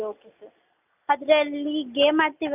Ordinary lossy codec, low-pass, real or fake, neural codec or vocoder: none; 3.6 kHz; real; none